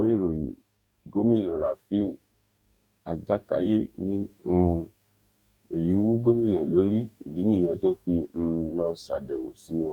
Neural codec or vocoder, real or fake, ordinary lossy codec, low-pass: codec, 44.1 kHz, 2.6 kbps, DAC; fake; none; 19.8 kHz